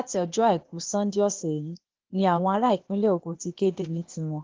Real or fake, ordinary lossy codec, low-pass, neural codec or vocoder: fake; Opus, 16 kbps; 7.2 kHz; codec, 16 kHz, 0.8 kbps, ZipCodec